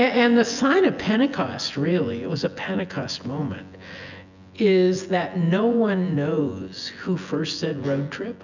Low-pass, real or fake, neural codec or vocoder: 7.2 kHz; fake; vocoder, 24 kHz, 100 mel bands, Vocos